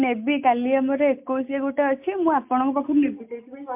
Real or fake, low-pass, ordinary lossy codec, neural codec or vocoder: real; 3.6 kHz; MP3, 32 kbps; none